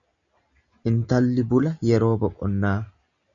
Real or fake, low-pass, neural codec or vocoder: real; 7.2 kHz; none